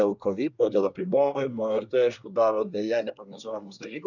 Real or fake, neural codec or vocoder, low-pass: fake; codec, 24 kHz, 1 kbps, SNAC; 7.2 kHz